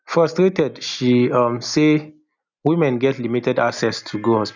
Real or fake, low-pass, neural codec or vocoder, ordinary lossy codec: real; 7.2 kHz; none; none